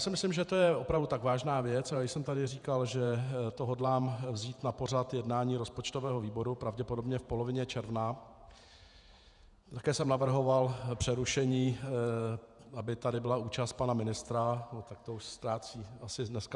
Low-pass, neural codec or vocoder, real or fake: 10.8 kHz; vocoder, 48 kHz, 128 mel bands, Vocos; fake